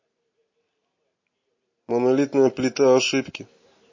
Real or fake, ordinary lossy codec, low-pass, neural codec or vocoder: real; MP3, 32 kbps; 7.2 kHz; none